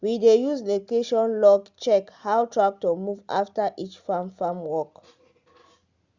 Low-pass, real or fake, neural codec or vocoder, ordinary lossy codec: 7.2 kHz; real; none; Opus, 64 kbps